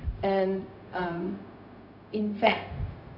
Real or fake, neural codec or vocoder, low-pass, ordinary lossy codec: fake; codec, 16 kHz, 0.4 kbps, LongCat-Audio-Codec; 5.4 kHz; none